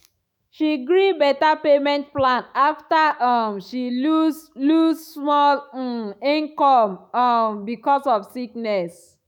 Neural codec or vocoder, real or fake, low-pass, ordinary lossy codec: autoencoder, 48 kHz, 128 numbers a frame, DAC-VAE, trained on Japanese speech; fake; 19.8 kHz; none